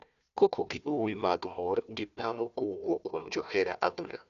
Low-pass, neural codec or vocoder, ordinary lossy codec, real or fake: 7.2 kHz; codec, 16 kHz, 1 kbps, FunCodec, trained on Chinese and English, 50 frames a second; none; fake